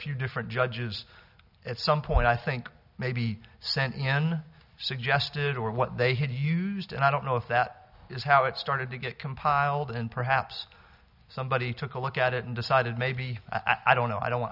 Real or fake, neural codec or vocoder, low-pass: real; none; 5.4 kHz